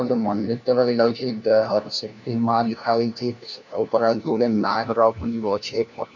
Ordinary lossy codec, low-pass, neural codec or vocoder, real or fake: none; 7.2 kHz; codec, 16 kHz, 1 kbps, FunCodec, trained on LibriTTS, 50 frames a second; fake